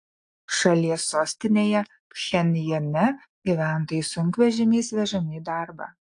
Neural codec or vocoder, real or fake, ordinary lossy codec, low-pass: none; real; AAC, 64 kbps; 9.9 kHz